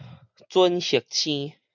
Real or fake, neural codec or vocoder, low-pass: real; none; 7.2 kHz